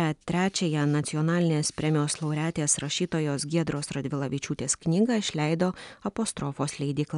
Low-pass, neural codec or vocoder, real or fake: 10.8 kHz; none; real